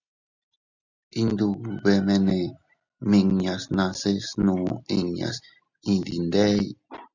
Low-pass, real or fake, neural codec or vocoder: 7.2 kHz; real; none